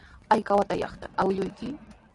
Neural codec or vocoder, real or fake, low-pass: none; real; 10.8 kHz